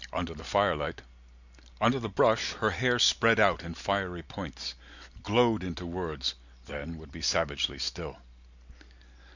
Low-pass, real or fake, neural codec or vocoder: 7.2 kHz; real; none